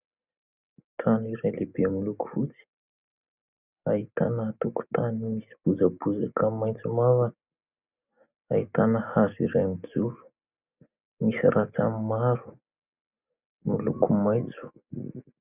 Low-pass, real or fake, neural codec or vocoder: 3.6 kHz; real; none